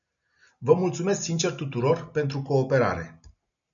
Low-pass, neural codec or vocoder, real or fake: 7.2 kHz; none; real